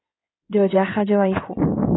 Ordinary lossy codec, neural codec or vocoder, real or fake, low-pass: AAC, 16 kbps; codec, 16 kHz in and 24 kHz out, 2.2 kbps, FireRedTTS-2 codec; fake; 7.2 kHz